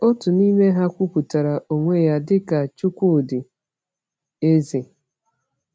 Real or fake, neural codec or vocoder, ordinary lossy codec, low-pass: real; none; none; none